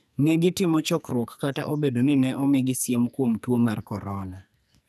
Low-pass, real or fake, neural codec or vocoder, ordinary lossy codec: 14.4 kHz; fake; codec, 44.1 kHz, 2.6 kbps, SNAC; none